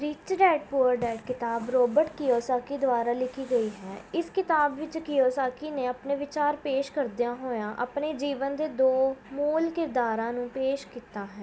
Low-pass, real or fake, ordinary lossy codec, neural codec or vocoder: none; real; none; none